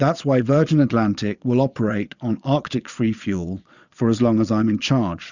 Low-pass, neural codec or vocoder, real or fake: 7.2 kHz; vocoder, 22.05 kHz, 80 mel bands, Vocos; fake